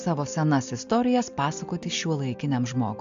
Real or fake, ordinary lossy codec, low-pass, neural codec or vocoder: real; AAC, 64 kbps; 7.2 kHz; none